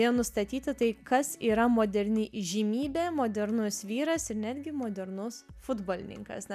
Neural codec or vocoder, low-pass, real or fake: none; 14.4 kHz; real